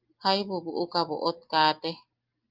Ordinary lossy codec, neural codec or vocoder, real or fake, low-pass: Opus, 32 kbps; none; real; 5.4 kHz